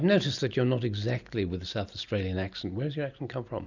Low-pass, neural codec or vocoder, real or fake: 7.2 kHz; none; real